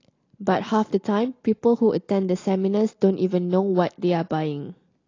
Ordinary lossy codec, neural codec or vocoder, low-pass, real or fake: AAC, 32 kbps; none; 7.2 kHz; real